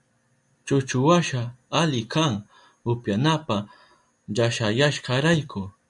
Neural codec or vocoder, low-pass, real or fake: none; 10.8 kHz; real